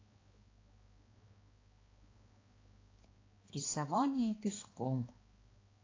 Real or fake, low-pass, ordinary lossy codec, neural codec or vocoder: fake; 7.2 kHz; AAC, 32 kbps; codec, 16 kHz, 2 kbps, X-Codec, HuBERT features, trained on balanced general audio